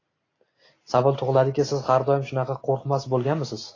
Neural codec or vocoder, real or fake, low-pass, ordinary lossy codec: none; real; 7.2 kHz; AAC, 32 kbps